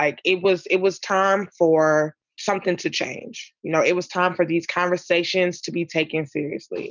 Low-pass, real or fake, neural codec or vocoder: 7.2 kHz; real; none